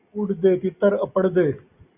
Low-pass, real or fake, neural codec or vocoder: 3.6 kHz; real; none